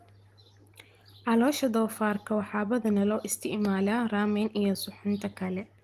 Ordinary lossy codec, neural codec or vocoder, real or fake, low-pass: Opus, 24 kbps; none; real; 14.4 kHz